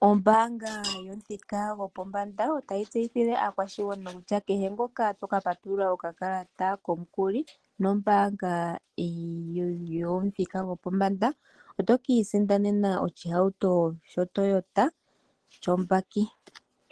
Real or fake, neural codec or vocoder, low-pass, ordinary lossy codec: real; none; 10.8 kHz; Opus, 16 kbps